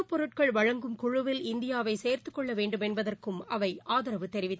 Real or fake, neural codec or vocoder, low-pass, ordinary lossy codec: real; none; none; none